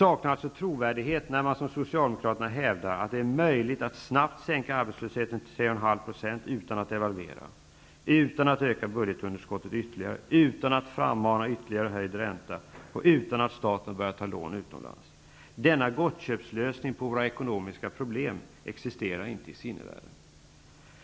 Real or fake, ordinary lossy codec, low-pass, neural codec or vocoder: real; none; none; none